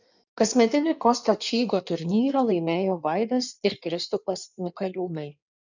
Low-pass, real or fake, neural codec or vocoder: 7.2 kHz; fake; codec, 16 kHz in and 24 kHz out, 1.1 kbps, FireRedTTS-2 codec